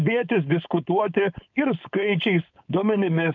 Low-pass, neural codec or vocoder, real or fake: 7.2 kHz; codec, 16 kHz, 4.8 kbps, FACodec; fake